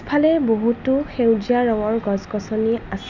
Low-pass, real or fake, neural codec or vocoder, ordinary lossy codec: 7.2 kHz; real; none; none